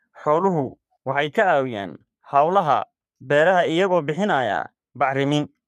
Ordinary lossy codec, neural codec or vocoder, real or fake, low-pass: none; codec, 44.1 kHz, 3.4 kbps, Pupu-Codec; fake; 14.4 kHz